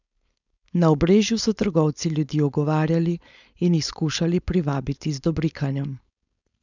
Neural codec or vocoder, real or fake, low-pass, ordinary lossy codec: codec, 16 kHz, 4.8 kbps, FACodec; fake; 7.2 kHz; none